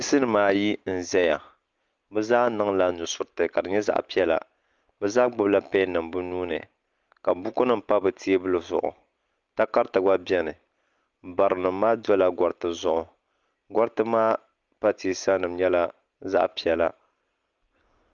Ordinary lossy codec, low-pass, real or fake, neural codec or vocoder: Opus, 32 kbps; 7.2 kHz; real; none